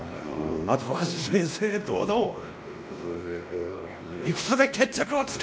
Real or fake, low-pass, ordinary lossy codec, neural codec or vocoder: fake; none; none; codec, 16 kHz, 1 kbps, X-Codec, WavLM features, trained on Multilingual LibriSpeech